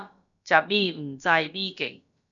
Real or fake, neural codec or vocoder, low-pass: fake; codec, 16 kHz, about 1 kbps, DyCAST, with the encoder's durations; 7.2 kHz